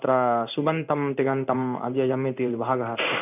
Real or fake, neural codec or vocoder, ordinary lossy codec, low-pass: fake; codec, 16 kHz in and 24 kHz out, 1 kbps, XY-Tokenizer; none; 3.6 kHz